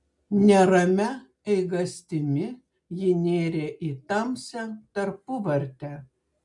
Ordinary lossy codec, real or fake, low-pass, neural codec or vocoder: MP3, 64 kbps; real; 10.8 kHz; none